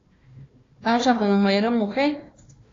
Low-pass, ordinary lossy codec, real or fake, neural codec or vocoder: 7.2 kHz; AAC, 32 kbps; fake; codec, 16 kHz, 1 kbps, FunCodec, trained on Chinese and English, 50 frames a second